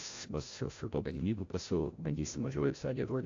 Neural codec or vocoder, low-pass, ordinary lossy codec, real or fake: codec, 16 kHz, 0.5 kbps, FreqCodec, larger model; 7.2 kHz; AAC, 48 kbps; fake